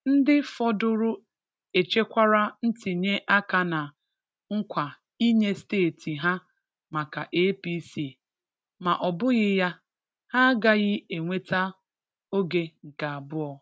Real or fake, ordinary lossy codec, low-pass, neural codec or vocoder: real; none; none; none